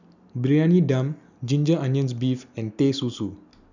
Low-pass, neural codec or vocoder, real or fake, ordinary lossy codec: 7.2 kHz; none; real; none